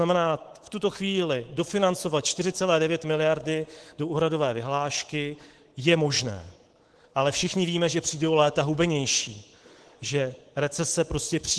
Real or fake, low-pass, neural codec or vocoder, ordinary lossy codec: fake; 10.8 kHz; codec, 24 kHz, 3.1 kbps, DualCodec; Opus, 16 kbps